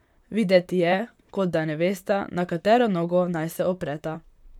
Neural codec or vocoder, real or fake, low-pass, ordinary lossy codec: vocoder, 44.1 kHz, 128 mel bands, Pupu-Vocoder; fake; 19.8 kHz; none